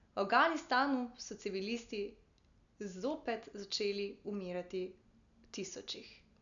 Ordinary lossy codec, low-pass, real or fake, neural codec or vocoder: MP3, 96 kbps; 7.2 kHz; real; none